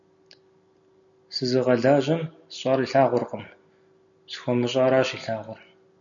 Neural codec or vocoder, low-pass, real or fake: none; 7.2 kHz; real